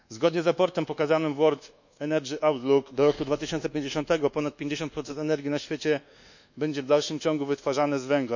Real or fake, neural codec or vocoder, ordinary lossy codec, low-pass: fake; codec, 24 kHz, 1.2 kbps, DualCodec; none; 7.2 kHz